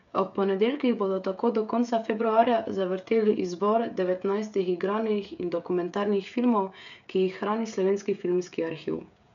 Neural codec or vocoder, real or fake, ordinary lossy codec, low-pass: codec, 16 kHz, 16 kbps, FreqCodec, smaller model; fake; none; 7.2 kHz